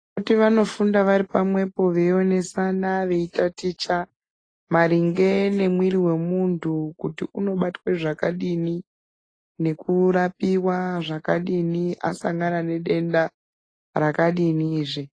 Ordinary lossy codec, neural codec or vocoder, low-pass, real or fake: AAC, 32 kbps; none; 9.9 kHz; real